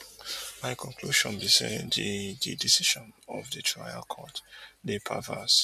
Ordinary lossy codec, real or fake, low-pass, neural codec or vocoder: none; real; 14.4 kHz; none